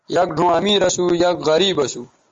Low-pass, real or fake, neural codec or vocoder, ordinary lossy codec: 7.2 kHz; real; none; Opus, 32 kbps